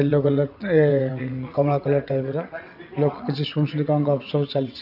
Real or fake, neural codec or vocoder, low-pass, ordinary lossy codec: fake; vocoder, 22.05 kHz, 80 mel bands, WaveNeXt; 5.4 kHz; none